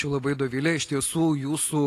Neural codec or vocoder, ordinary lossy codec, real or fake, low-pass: none; AAC, 48 kbps; real; 14.4 kHz